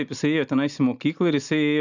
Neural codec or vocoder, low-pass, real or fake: none; 7.2 kHz; real